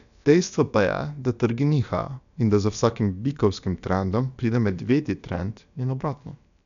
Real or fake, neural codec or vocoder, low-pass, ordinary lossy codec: fake; codec, 16 kHz, about 1 kbps, DyCAST, with the encoder's durations; 7.2 kHz; none